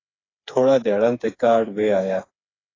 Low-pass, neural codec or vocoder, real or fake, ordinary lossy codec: 7.2 kHz; codec, 16 kHz, 4 kbps, FreqCodec, smaller model; fake; AAC, 32 kbps